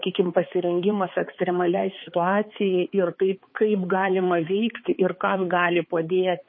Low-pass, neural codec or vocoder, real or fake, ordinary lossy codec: 7.2 kHz; codec, 16 kHz, 4 kbps, X-Codec, HuBERT features, trained on balanced general audio; fake; MP3, 24 kbps